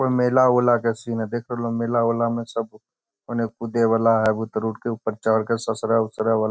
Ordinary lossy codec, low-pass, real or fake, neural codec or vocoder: none; none; real; none